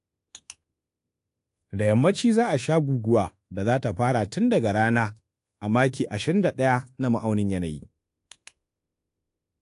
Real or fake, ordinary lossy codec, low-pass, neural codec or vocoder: fake; AAC, 48 kbps; 10.8 kHz; codec, 24 kHz, 1.2 kbps, DualCodec